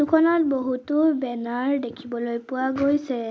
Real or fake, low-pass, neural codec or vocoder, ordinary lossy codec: real; none; none; none